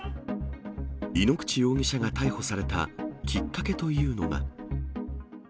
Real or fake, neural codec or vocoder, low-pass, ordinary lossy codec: real; none; none; none